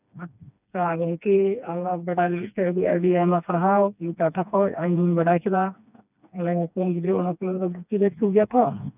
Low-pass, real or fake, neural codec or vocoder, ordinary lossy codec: 3.6 kHz; fake; codec, 16 kHz, 2 kbps, FreqCodec, smaller model; none